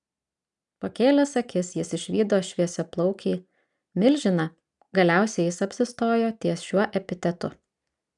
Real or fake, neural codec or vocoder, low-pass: real; none; 10.8 kHz